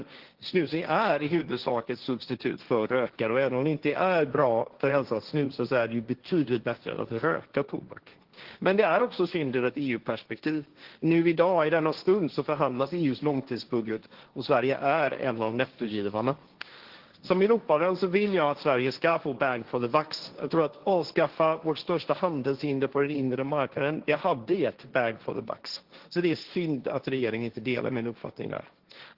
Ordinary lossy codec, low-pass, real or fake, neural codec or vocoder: Opus, 16 kbps; 5.4 kHz; fake; codec, 16 kHz, 1.1 kbps, Voila-Tokenizer